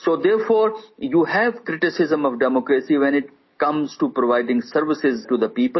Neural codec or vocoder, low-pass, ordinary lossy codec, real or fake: none; 7.2 kHz; MP3, 24 kbps; real